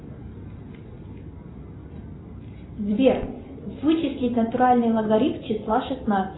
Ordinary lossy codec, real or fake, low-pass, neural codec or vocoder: AAC, 16 kbps; real; 7.2 kHz; none